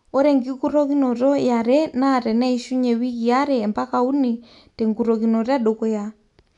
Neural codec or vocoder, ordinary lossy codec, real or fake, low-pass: none; none; real; 10.8 kHz